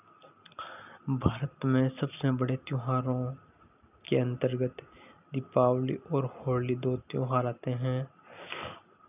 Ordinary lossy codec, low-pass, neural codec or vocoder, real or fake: none; 3.6 kHz; none; real